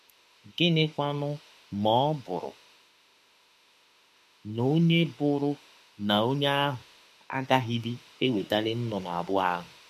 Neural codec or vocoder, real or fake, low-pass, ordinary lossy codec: autoencoder, 48 kHz, 32 numbers a frame, DAC-VAE, trained on Japanese speech; fake; 14.4 kHz; MP3, 64 kbps